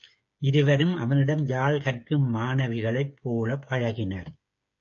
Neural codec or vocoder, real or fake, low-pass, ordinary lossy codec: codec, 16 kHz, 8 kbps, FreqCodec, smaller model; fake; 7.2 kHz; AAC, 48 kbps